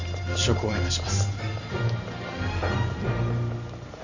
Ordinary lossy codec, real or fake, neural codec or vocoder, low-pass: none; fake; vocoder, 44.1 kHz, 128 mel bands every 512 samples, BigVGAN v2; 7.2 kHz